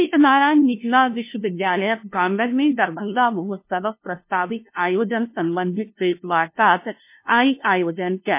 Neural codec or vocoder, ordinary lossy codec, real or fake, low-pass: codec, 16 kHz, 0.5 kbps, FunCodec, trained on LibriTTS, 25 frames a second; MP3, 24 kbps; fake; 3.6 kHz